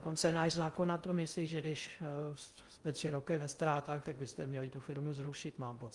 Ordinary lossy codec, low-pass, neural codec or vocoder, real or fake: Opus, 32 kbps; 10.8 kHz; codec, 16 kHz in and 24 kHz out, 0.6 kbps, FocalCodec, streaming, 2048 codes; fake